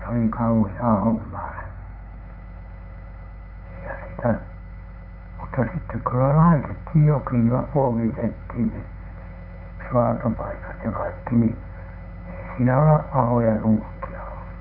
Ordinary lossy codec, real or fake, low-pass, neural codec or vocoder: none; fake; 5.4 kHz; codec, 16 kHz, 8 kbps, FunCodec, trained on LibriTTS, 25 frames a second